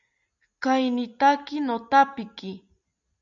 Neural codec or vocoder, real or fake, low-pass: none; real; 7.2 kHz